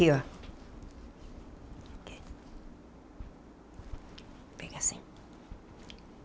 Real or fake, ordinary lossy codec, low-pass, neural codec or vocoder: real; none; none; none